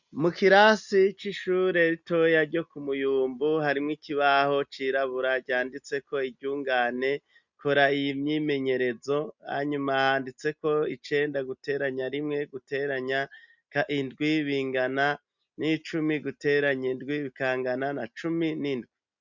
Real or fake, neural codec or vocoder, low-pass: real; none; 7.2 kHz